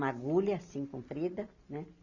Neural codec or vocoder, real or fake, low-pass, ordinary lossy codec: none; real; 7.2 kHz; none